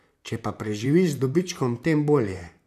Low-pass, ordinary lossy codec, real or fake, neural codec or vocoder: 14.4 kHz; none; fake; vocoder, 44.1 kHz, 128 mel bands, Pupu-Vocoder